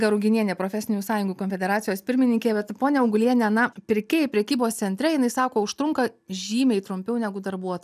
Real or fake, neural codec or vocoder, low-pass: real; none; 14.4 kHz